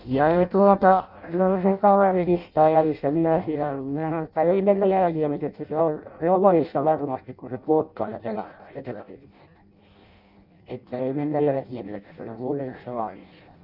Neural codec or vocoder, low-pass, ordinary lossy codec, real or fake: codec, 16 kHz in and 24 kHz out, 0.6 kbps, FireRedTTS-2 codec; 5.4 kHz; none; fake